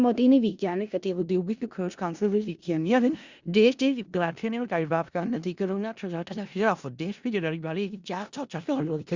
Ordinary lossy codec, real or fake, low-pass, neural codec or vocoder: Opus, 64 kbps; fake; 7.2 kHz; codec, 16 kHz in and 24 kHz out, 0.4 kbps, LongCat-Audio-Codec, four codebook decoder